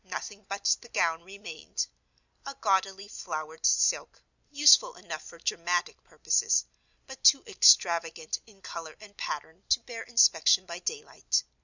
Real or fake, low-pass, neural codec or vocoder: real; 7.2 kHz; none